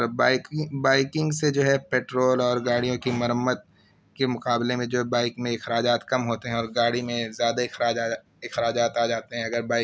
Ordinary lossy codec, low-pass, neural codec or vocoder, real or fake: none; none; none; real